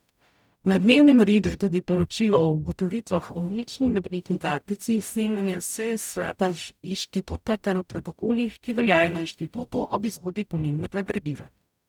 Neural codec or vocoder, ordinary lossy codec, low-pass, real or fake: codec, 44.1 kHz, 0.9 kbps, DAC; none; 19.8 kHz; fake